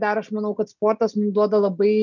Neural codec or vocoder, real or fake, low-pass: none; real; 7.2 kHz